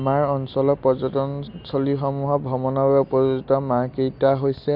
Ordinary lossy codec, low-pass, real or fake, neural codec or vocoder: MP3, 48 kbps; 5.4 kHz; real; none